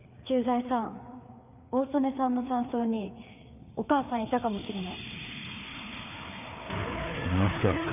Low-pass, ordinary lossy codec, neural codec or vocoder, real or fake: 3.6 kHz; none; codec, 16 kHz, 4 kbps, FreqCodec, larger model; fake